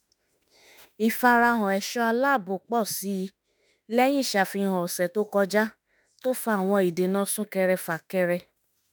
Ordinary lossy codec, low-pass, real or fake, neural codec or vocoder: none; none; fake; autoencoder, 48 kHz, 32 numbers a frame, DAC-VAE, trained on Japanese speech